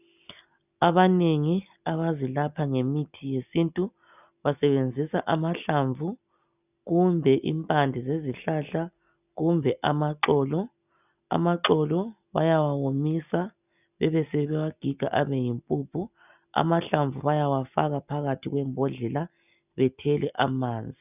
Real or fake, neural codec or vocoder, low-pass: real; none; 3.6 kHz